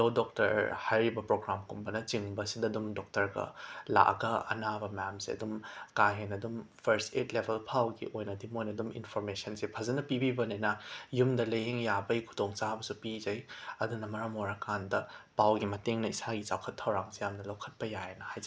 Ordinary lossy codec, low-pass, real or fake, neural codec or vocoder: none; none; real; none